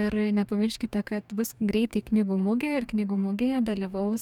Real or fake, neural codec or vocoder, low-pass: fake; codec, 44.1 kHz, 2.6 kbps, DAC; 19.8 kHz